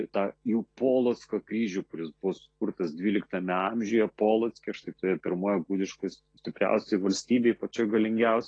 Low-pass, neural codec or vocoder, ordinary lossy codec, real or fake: 9.9 kHz; none; AAC, 32 kbps; real